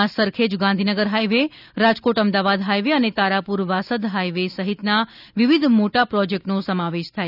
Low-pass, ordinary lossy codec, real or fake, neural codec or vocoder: 5.4 kHz; none; real; none